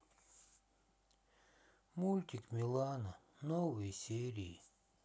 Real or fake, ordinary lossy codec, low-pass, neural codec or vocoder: real; none; none; none